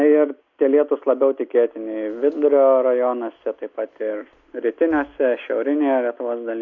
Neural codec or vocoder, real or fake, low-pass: none; real; 7.2 kHz